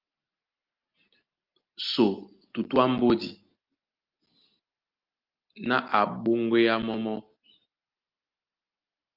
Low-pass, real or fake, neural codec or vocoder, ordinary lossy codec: 5.4 kHz; real; none; Opus, 32 kbps